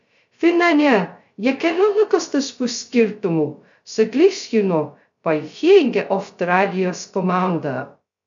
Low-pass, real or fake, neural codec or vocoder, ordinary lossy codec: 7.2 kHz; fake; codec, 16 kHz, 0.2 kbps, FocalCodec; MP3, 64 kbps